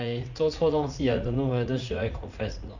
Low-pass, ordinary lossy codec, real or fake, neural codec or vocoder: 7.2 kHz; none; fake; vocoder, 44.1 kHz, 80 mel bands, Vocos